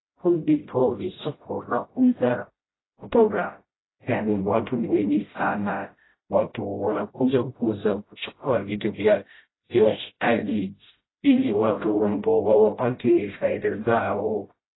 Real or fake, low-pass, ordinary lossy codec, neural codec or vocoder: fake; 7.2 kHz; AAC, 16 kbps; codec, 16 kHz, 0.5 kbps, FreqCodec, smaller model